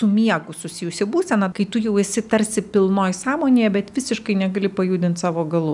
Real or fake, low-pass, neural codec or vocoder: real; 10.8 kHz; none